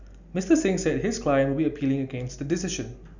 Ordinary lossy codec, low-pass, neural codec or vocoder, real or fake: none; 7.2 kHz; none; real